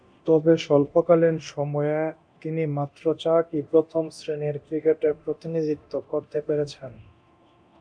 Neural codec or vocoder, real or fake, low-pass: codec, 24 kHz, 0.9 kbps, DualCodec; fake; 9.9 kHz